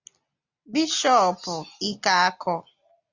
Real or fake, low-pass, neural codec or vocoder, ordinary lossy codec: real; 7.2 kHz; none; Opus, 64 kbps